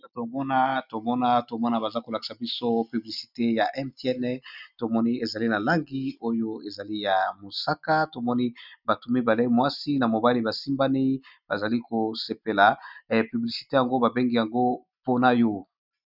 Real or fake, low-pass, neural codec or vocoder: real; 5.4 kHz; none